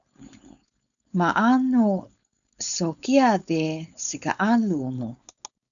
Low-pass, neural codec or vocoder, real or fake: 7.2 kHz; codec, 16 kHz, 4.8 kbps, FACodec; fake